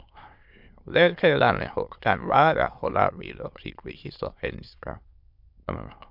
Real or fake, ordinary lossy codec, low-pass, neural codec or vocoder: fake; MP3, 48 kbps; 5.4 kHz; autoencoder, 22.05 kHz, a latent of 192 numbers a frame, VITS, trained on many speakers